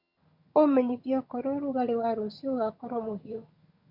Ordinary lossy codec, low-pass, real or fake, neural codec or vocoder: none; 5.4 kHz; fake; vocoder, 22.05 kHz, 80 mel bands, HiFi-GAN